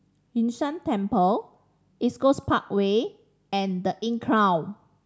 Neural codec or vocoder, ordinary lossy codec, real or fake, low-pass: none; none; real; none